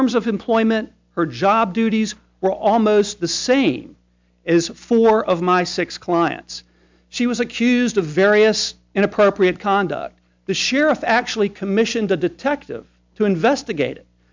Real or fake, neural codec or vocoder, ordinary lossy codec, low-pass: real; none; MP3, 64 kbps; 7.2 kHz